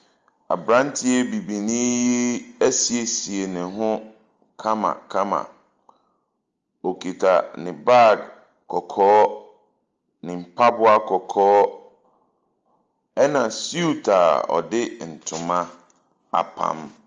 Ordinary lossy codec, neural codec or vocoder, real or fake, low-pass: Opus, 32 kbps; none; real; 7.2 kHz